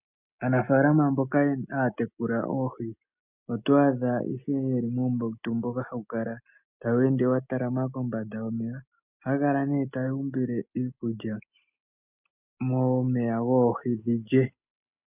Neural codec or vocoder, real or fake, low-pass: none; real; 3.6 kHz